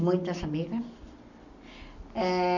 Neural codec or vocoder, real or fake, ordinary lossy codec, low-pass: none; real; none; 7.2 kHz